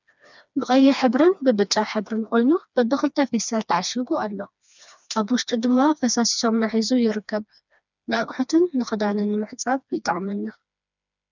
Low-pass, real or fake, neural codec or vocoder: 7.2 kHz; fake; codec, 16 kHz, 2 kbps, FreqCodec, smaller model